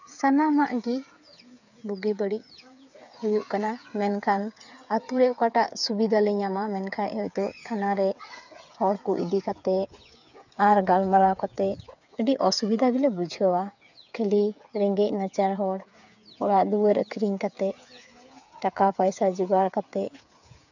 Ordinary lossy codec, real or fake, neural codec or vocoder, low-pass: none; fake; codec, 16 kHz, 8 kbps, FreqCodec, smaller model; 7.2 kHz